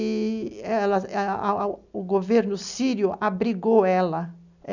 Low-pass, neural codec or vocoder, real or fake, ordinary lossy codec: 7.2 kHz; none; real; none